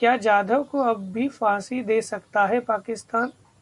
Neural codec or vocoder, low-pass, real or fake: none; 10.8 kHz; real